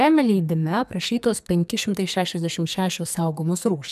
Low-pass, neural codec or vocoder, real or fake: 14.4 kHz; codec, 44.1 kHz, 2.6 kbps, SNAC; fake